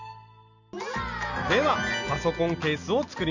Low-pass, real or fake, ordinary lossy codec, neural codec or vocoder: 7.2 kHz; real; none; none